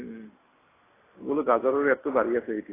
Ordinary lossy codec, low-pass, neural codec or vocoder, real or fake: AAC, 16 kbps; 3.6 kHz; none; real